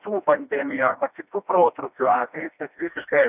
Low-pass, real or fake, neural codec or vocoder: 3.6 kHz; fake; codec, 16 kHz, 1 kbps, FreqCodec, smaller model